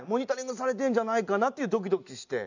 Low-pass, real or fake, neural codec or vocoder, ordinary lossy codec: 7.2 kHz; real; none; none